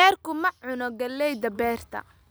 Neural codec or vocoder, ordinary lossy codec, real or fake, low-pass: none; none; real; none